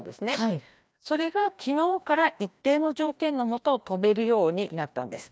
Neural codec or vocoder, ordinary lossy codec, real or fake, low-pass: codec, 16 kHz, 1 kbps, FreqCodec, larger model; none; fake; none